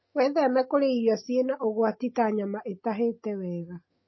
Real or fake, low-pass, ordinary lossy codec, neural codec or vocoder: real; 7.2 kHz; MP3, 24 kbps; none